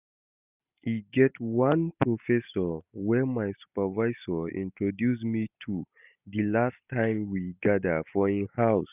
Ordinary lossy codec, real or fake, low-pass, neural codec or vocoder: none; real; 3.6 kHz; none